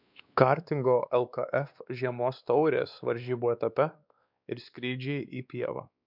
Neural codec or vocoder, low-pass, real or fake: codec, 16 kHz, 2 kbps, X-Codec, WavLM features, trained on Multilingual LibriSpeech; 5.4 kHz; fake